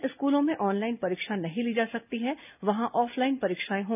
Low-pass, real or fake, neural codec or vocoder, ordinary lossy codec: 3.6 kHz; real; none; none